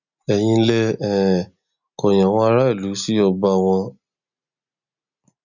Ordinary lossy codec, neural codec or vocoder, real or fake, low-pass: none; none; real; 7.2 kHz